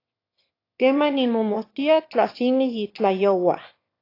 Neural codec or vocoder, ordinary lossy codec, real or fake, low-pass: autoencoder, 22.05 kHz, a latent of 192 numbers a frame, VITS, trained on one speaker; AAC, 32 kbps; fake; 5.4 kHz